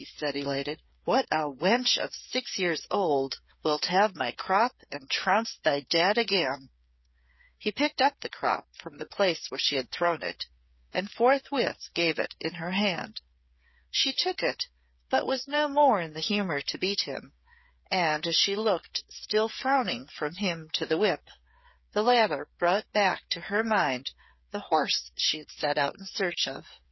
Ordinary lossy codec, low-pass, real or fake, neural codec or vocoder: MP3, 24 kbps; 7.2 kHz; fake; codec, 16 kHz, 8 kbps, FreqCodec, smaller model